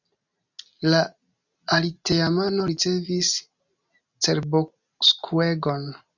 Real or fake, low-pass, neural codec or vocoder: real; 7.2 kHz; none